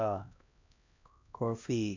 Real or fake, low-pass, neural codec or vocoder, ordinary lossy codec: fake; 7.2 kHz; codec, 16 kHz, 1 kbps, X-Codec, HuBERT features, trained on LibriSpeech; none